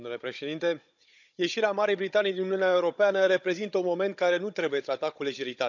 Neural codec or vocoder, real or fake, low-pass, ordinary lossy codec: codec, 16 kHz, 16 kbps, FunCodec, trained on Chinese and English, 50 frames a second; fake; 7.2 kHz; none